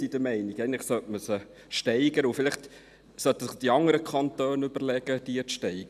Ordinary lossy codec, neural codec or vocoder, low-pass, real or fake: none; none; 14.4 kHz; real